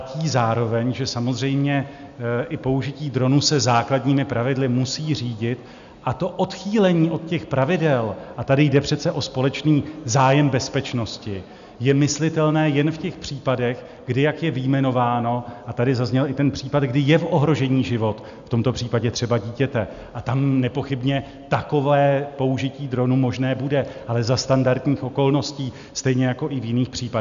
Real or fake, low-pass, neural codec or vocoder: real; 7.2 kHz; none